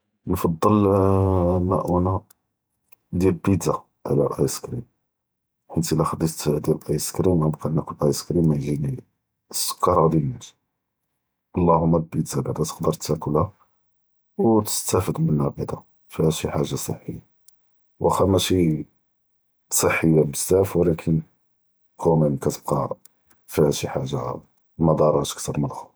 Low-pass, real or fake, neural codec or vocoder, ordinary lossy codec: none; fake; vocoder, 48 kHz, 128 mel bands, Vocos; none